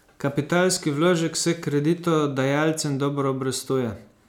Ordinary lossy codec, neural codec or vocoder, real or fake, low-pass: none; none; real; 19.8 kHz